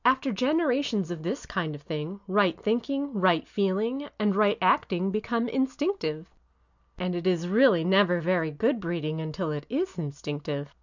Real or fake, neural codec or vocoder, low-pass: real; none; 7.2 kHz